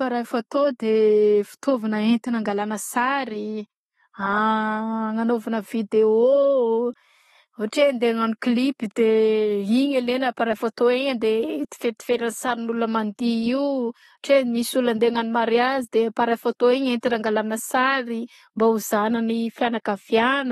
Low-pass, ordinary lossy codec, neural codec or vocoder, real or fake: 14.4 kHz; AAC, 32 kbps; none; real